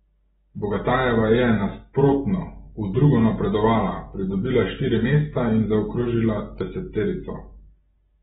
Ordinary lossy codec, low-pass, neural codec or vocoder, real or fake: AAC, 16 kbps; 7.2 kHz; none; real